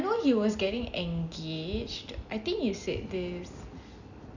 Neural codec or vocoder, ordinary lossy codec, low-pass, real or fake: none; none; 7.2 kHz; real